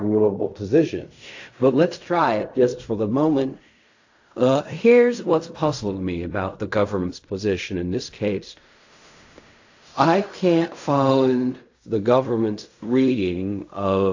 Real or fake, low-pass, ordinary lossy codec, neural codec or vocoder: fake; 7.2 kHz; AAC, 48 kbps; codec, 16 kHz in and 24 kHz out, 0.4 kbps, LongCat-Audio-Codec, fine tuned four codebook decoder